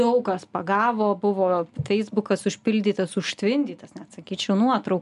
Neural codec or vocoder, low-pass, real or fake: none; 10.8 kHz; real